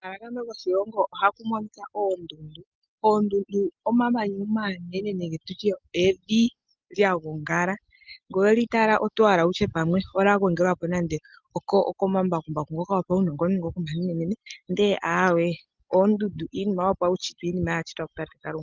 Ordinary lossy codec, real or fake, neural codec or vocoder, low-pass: Opus, 32 kbps; real; none; 7.2 kHz